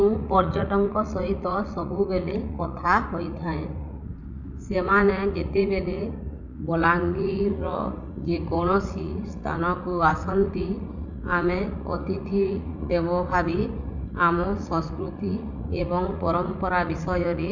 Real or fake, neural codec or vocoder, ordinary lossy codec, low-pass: fake; vocoder, 44.1 kHz, 80 mel bands, Vocos; none; 7.2 kHz